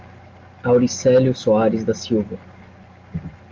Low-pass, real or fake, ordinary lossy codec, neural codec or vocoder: 7.2 kHz; real; Opus, 32 kbps; none